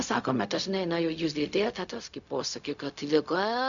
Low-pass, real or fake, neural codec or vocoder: 7.2 kHz; fake; codec, 16 kHz, 0.4 kbps, LongCat-Audio-Codec